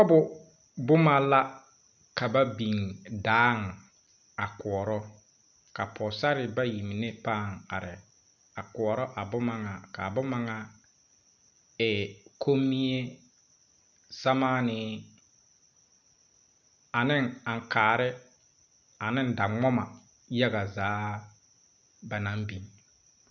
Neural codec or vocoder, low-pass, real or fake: none; 7.2 kHz; real